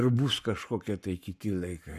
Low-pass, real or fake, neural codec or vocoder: 14.4 kHz; fake; codec, 44.1 kHz, 7.8 kbps, Pupu-Codec